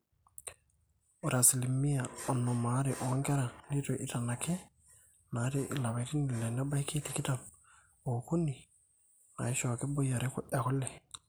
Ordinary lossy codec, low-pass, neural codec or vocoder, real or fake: none; none; none; real